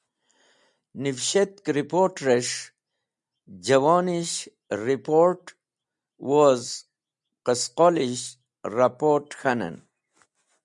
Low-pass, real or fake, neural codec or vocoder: 10.8 kHz; real; none